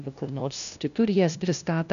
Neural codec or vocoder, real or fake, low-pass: codec, 16 kHz, 0.5 kbps, FunCodec, trained on LibriTTS, 25 frames a second; fake; 7.2 kHz